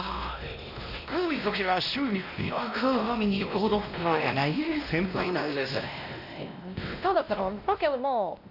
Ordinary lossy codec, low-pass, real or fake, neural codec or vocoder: none; 5.4 kHz; fake; codec, 16 kHz, 1 kbps, X-Codec, WavLM features, trained on Multilingual LibriSpeech